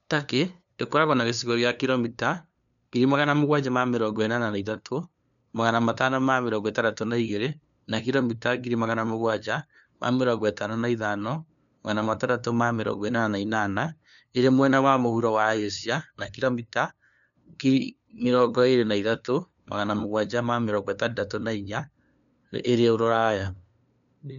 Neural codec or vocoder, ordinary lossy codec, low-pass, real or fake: codec, 16 kHz, 2 kbps, FunCodec, trained on LibriTTS, 25 frames a second; none; 7.2 kHz; fake